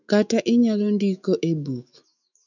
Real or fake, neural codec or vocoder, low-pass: fake; autoencoder, 48 kHz, 128 numbers a frame, DAC-VAE, trained on Japanese speech; 7.2 kHz